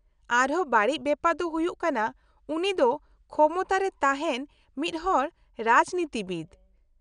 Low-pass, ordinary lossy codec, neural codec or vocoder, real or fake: 9.9 kHz; none; none; real